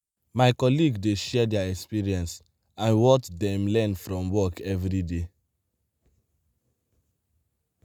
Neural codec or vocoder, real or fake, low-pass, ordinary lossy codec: none; real; none; none